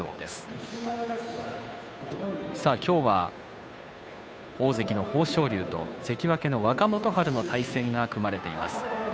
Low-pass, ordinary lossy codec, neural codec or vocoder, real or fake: none; none; codec, 16 kHz, 2 kbps, FunCodec, trained on Chinese and English, 25 frames a second; fake